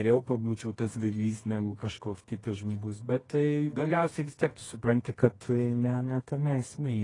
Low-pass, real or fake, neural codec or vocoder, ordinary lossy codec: 10.8 kHz; fake; codec, 24 kHz, 0.9 kbps, WavTokenizer, medium music audio release; AAC, 32 kbps